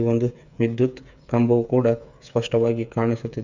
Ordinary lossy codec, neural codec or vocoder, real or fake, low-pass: none; codec, 16 kHz, 8 kbps, FreqCodec, smaller model; fake; 7.2 kHz